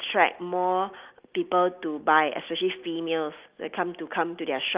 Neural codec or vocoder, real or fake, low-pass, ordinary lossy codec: none; real; 3.6 kHz; Opus, 32 kbps